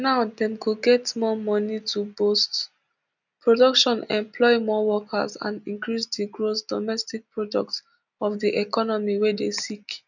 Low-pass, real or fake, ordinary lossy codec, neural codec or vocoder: 7.2 kHz; real; none; none